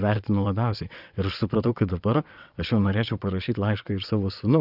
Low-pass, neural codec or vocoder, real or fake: 5.4 kHz; vocoder, 22.05 kHz, 80 mel bands, WaveNeXt; fake